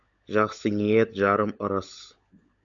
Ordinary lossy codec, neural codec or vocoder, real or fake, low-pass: MP3, 96 kbps; codec, 16 kHz, 16 kbps, FunCodec, trained on LibriTTS, 50 frames a second; fake; 7.2 kHz